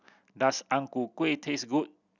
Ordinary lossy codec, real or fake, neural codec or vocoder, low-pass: none; real; none; 7.2 kHz